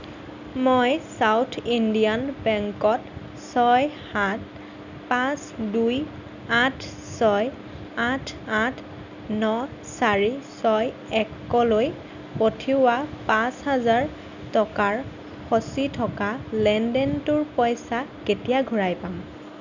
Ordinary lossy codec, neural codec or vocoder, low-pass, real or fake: none; none; 7.2 kHz; real